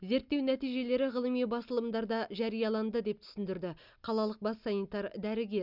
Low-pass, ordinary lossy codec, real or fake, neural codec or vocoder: 5.4 kHz; Opus, 64 kbps; real; none